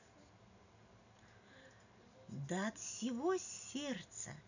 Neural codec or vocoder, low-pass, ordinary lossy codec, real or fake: none; 7.2 kHz; none; real